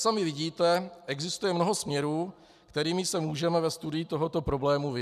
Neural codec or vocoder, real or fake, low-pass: none; real; 14.4 kHz